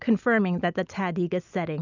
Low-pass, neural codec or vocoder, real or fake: 7.2 kHz; none; real